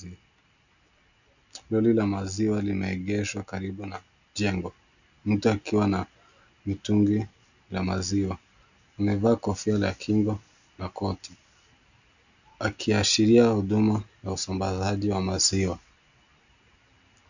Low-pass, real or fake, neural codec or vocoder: 7.2 kHz; real; none